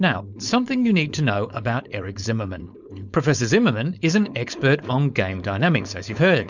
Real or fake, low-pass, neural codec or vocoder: fake; 7.2 kHz; codec, 16 kHz, 4.8 kbps, FACodec